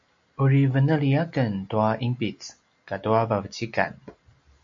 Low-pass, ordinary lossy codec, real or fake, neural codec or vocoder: 7.2 kHz; MP3, 48 kbps; real; none